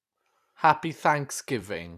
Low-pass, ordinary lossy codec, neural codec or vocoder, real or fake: 14.4 kHz; none; vocoder, 44.1 kHz, 128 mel bands every 256 samples, BigVGAN v2; fake